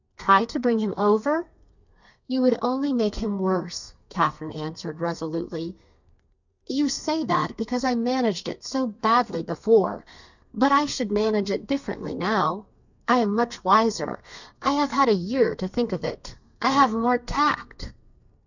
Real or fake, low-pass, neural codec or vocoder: fake; 7.2 kHz; codec, 32 kHz, 1.9 kbps, SNAC